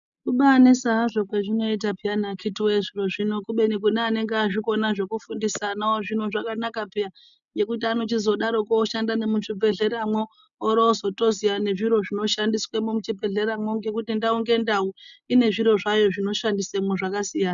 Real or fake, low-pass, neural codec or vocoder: real; 7.2 kHz; none